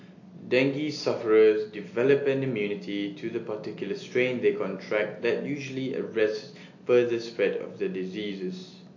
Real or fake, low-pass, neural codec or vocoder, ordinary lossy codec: real; 7.2 kHz; none; AAC, 48 kbps